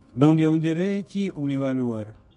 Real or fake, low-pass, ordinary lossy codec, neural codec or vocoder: fake; 10.8 kHz; MP3, 64 kbps; codec, 24 kHz, 0.9 kbps, WavTokenizer, medium music audio release